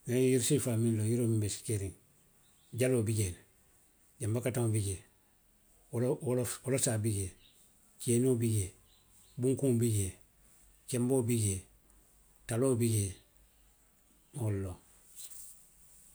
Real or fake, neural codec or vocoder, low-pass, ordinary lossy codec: real; none; none; none